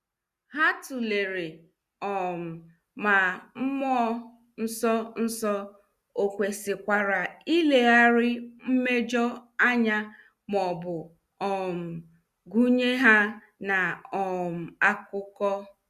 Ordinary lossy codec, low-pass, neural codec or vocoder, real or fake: Opus, 64 kbps; 14.4 kHz; none; real